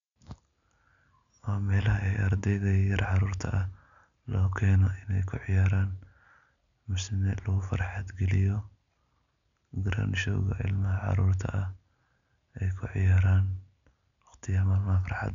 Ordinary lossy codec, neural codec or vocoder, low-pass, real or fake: none; none; 7.2 kHz; real